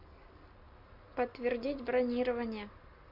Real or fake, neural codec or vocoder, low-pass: real; none; 5.4 kHz